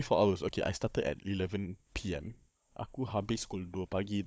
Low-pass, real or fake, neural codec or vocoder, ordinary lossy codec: none; fake; codec, 16 kHz, 16 kbps, FunCodec, trained on Chinese and English, 50 frames a second; none